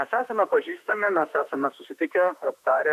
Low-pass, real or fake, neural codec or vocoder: 14.4 kHz; fake; autoencoder, 48 kHz, 32 numbers a frame, DAC-VAE, trained on Japanese speech